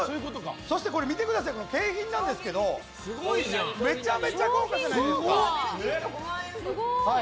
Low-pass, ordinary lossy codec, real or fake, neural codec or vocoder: none; none; real; none